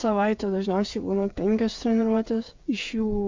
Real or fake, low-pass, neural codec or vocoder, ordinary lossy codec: fake; 7.2 kHz; codec, 16 kHz, 8 kbps, FreqCodec, smaller model; MP3, 64 kbps